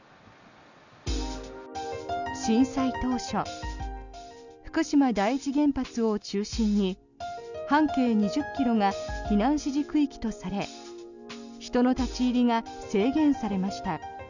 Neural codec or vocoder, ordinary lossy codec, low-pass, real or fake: none; none; 7.2 kHz; real